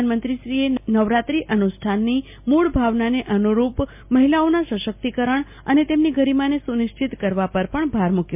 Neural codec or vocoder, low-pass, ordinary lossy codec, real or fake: none; 3.6 kHz; MP3, 32 kbps; real